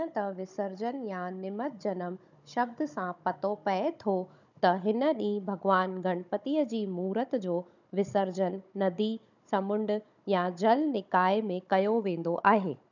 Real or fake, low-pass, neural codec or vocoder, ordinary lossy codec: fake; 7.2 kHz; codec, 16 kHz, 16 kbps, FunCodec, trained on Chinese and English, 50 frames a second; none